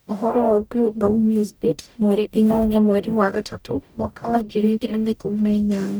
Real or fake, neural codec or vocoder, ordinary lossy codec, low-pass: fake; codec, 44.1 kHz, 0.9 kbps, DAC; none; none